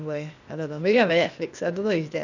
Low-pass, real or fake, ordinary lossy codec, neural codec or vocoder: 7.2 kHz; fake; none; codec, 16 kHz, 0.8 kbps, ZipCodec